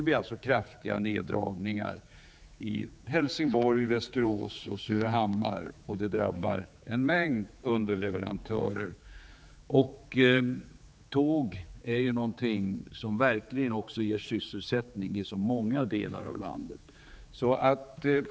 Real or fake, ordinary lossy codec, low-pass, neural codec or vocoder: fake; none; none; codec, 16 kHz, 4 kbps, X-Codec, HuBERT features, trained on general audio